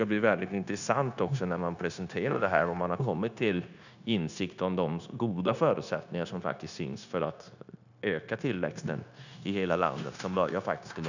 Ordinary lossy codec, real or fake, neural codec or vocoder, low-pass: none; fake; codec, 16 kHz, 0.9 kbps, LongCat-Audio-Codec; 7.2 kHz